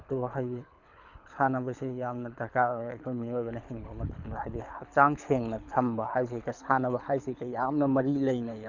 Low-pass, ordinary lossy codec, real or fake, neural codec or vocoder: 7.2 kHz; none; fake; codec, 24 kHz, 6 kbps, HILCodec